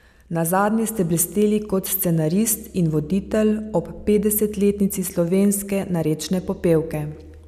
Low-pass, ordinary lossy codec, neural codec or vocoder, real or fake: 14.4 kHz; none; none; real